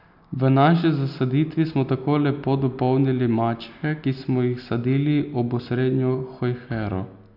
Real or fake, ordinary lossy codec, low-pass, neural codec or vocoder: real; none; 5.4 kHz; none